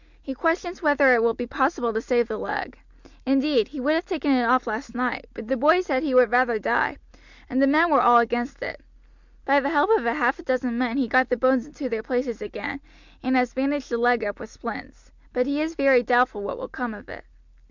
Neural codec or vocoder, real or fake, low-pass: none; real; 7.2 kHz